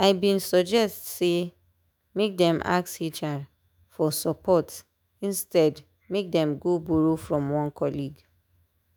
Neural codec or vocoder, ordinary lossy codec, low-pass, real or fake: autoencoder, 48 kHz, 128 numbers a frame, DAC-VAE, trained on Japanese speech; none; none; fake